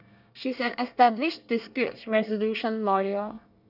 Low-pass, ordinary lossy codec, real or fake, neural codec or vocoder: 5.4 kHz; none; fake; codec, 24 kHz, 1 kbps, SNAC